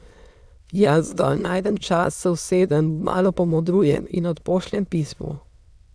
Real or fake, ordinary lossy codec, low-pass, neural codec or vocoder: fake; none; none; autoencoder, 22.05 kHz, a latent of 192 numbers a frame, VITS, trained on many speakers